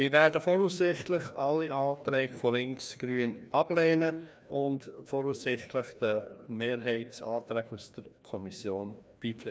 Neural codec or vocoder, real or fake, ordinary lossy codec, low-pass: codec, 16 kHz, 1 kbps, FreqCodec, larger model; fake; none; none